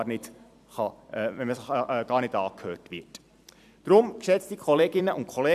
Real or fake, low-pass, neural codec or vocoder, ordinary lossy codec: fake; 14.4 kHz; vocoder, 44.1 kHz, 128 mel bands every 256 samples, BigVGAN v2; none